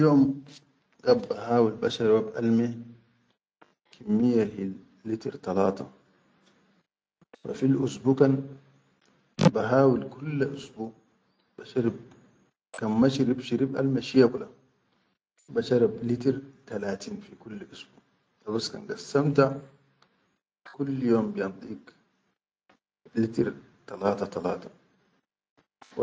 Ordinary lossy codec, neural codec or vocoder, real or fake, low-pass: none; none; real; none